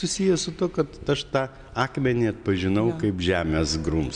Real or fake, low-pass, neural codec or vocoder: real; 9.9 kHz; none